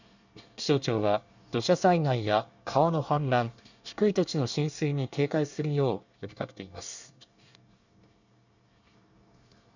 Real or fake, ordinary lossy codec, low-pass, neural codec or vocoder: fake; none; 7.2 kHz; codec, 24 kHz, 1 kbps, SNAC